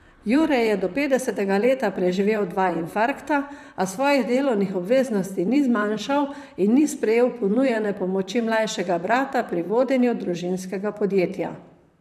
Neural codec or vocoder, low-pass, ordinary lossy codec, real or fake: vocoder, 44.1 kHz, 128 mel bands, Pupu-Vocoder; 14.4 kHz; none; fake